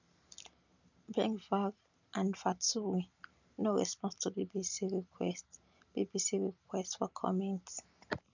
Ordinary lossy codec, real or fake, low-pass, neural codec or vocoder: none; real; 7.2 kHz; none